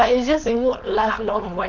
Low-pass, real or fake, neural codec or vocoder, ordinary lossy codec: 7.2 kHz; fake; codec, 16 kHz, 4.8 kbps, FACodec; none